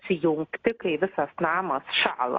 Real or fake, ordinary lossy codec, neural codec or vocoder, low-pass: real; AAC, 32 kbps; none; 7.2 kHz